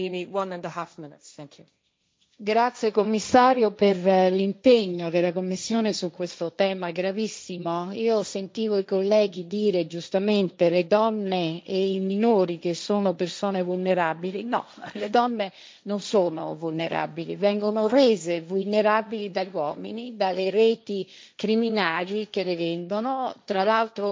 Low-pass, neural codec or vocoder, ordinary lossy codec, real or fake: none; codec, 16 kHz, 1.1 kbps, Voila-Tokenizer; none; fake